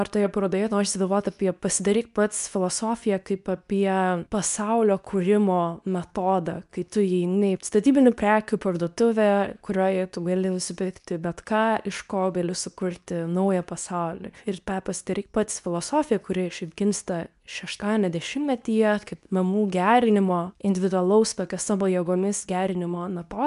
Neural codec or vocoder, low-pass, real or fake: codec, 24 kHz, 0.9 kbps, WavTokenizer, medium speech release version 2; 10.8 kHz; fake